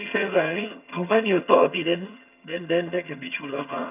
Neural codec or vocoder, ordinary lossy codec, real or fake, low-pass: vocoder, 22.05 kHz, 80 mel bands, HiFi-GAN; none; fake; 3.6 kHz